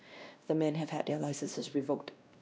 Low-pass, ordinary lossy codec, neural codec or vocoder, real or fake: none; none; codec, 16 kHz, 1 kbps, X-Codec, WavLM features, trained on Multilingual LibriSpeech; fake